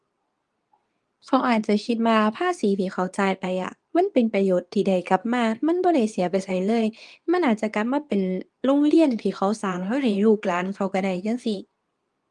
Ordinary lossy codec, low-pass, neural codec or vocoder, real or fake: Opus, 32 kbps; 10.8 kHz; codec, 24 kHz, 0.9 kbps, WavTokenizer, medium speech release version 2; fake